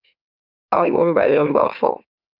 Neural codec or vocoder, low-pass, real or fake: autoencoder, 44.1 kHz, a latent of 192 numbers a frame, MeloTTS; 5.4 kHz; fake